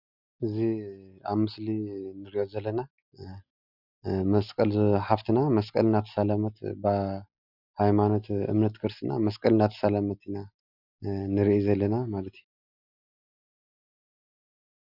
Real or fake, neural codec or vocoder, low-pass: real; none; 5.4 kHz